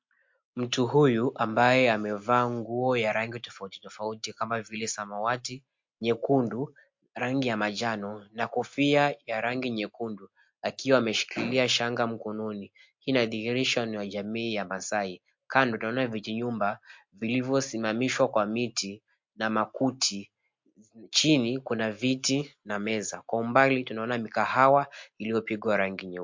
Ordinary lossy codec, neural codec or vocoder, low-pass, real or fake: MP3, 48 kbps; none; 7.2 kHz; real